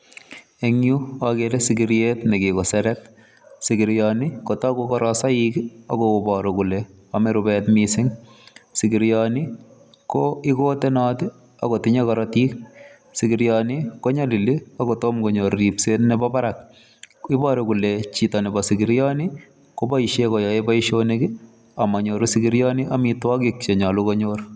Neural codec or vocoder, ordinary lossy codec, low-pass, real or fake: none; none; none; real